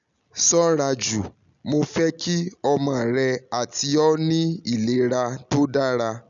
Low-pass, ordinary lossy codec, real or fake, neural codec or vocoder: 7.2 kHz; none; real; none